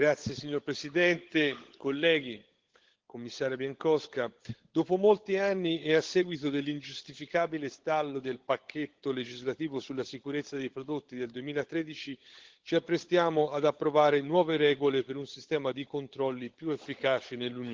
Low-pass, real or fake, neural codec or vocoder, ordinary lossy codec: 7.2 kHz; fake; codec, 16 kHz, 16 kbps, FunCodec, trained on LibriTTS, 50 frames a second; Opus, 16 kbps